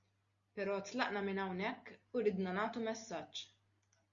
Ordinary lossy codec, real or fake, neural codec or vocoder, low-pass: Opus, 64 kbps; real; none; 7.2 kHz